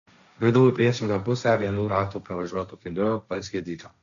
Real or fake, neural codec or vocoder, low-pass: fake; codec, 16 kHz, 1.1 kbps, Voila-Tokenizer; 7.2 kHz